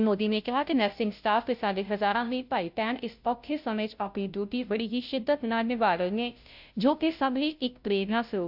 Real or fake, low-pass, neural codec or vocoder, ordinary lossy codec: fake; 5.4 kHz; codec, 16 kHz, 0.5 kbps, FunCodec, trained on Chinese and English, 25 frames a second; AAC, 48 kbps